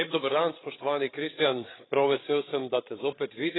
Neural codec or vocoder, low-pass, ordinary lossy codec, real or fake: codec, 16 kHz, 16 kbps, FreqCodec, larger model; 7.2 kHz; AAC, 16 kbps; fake